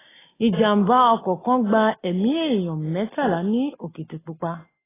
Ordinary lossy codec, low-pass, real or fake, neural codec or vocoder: AAC, 16 kbps; 3.6 kHz; real; none